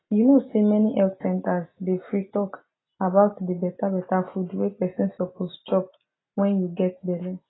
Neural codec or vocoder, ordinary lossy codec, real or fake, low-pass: none; AAC, 16 kbps; real; 7.2 kHz